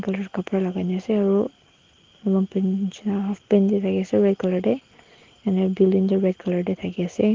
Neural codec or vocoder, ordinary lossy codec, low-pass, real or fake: none; Opus, 16 kbps; 7.2 kHz; real